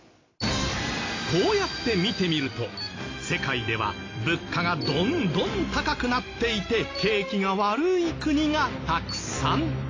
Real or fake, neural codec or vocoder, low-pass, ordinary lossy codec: real; none; 7.2 kHz; AAC, 32 kbps